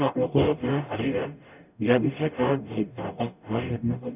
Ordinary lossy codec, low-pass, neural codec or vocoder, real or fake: none; 3.6 kHz; codec, 44.1 kHz, 0.9 kbps, DAC; fake